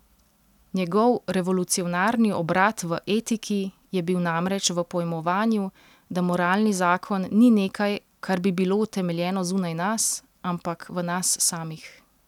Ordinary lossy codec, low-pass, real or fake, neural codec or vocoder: none; 19.8 kHz; real; none